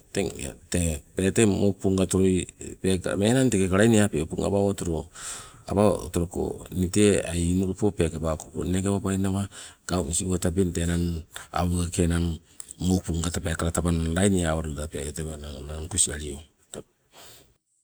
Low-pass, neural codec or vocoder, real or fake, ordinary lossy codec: none; none; real; none